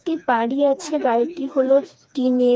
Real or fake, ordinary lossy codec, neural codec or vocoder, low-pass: fake; none; codec, 16 kHz, 2 kbps, FreqCodec, smaller model; none